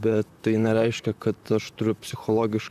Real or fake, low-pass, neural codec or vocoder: fake; 14.4 kHz; vocoder, 44.1 kHz, 128 mel bands, Pupu-Vocoder